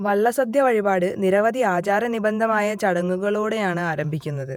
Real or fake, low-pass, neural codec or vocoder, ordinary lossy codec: fake; 19.8 kHz; vocoder, 48 kHz, 128 mel bands, Vocos; none